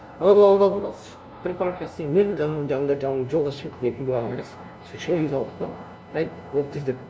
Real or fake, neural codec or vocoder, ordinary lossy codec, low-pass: fake; codec, 16 kHz, 0.5 kbps, FunCodec, trained on LibriTTS, 25 frames a second; none; none